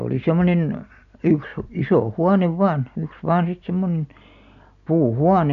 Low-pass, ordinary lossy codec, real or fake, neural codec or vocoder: 7.2 kHz; none; real; none